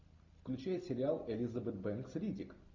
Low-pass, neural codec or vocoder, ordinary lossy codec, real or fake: 7.2 kHz; none; MP3, 48 kbps; real